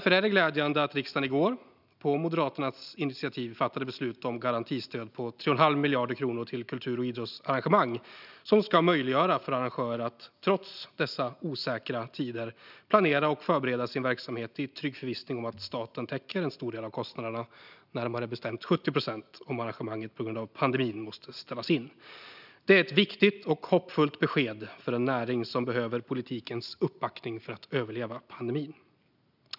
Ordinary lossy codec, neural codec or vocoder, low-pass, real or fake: none; none; 5.4 kHz; real